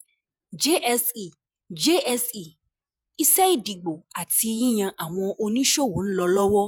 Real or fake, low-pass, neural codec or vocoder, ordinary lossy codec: fake; none; vocoder, 48 kHz, 128 mel bands, Vocos; none